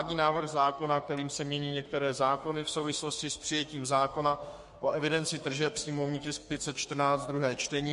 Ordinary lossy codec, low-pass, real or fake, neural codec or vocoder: MP3, 48 kbps; 14.4 kHz; fake; codec, 32 kHz, 1.9 kbps, SNAC